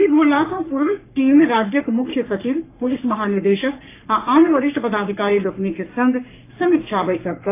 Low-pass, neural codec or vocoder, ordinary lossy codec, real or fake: 3.6 kHz; codec, 44.1 kHz, 3.4 kbps, Pupu-Codec; AAC, 24 kbps; fake